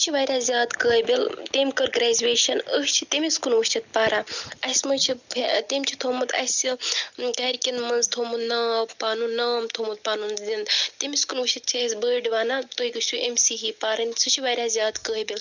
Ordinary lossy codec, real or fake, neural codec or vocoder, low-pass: none; real; none; 7.2 kHz